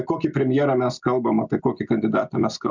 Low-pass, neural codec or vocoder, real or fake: 7.2 kHz; none; real